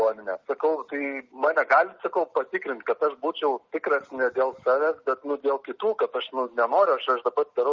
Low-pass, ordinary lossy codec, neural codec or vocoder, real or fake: 7.2 kHz; Opus, 32 kbps; none; real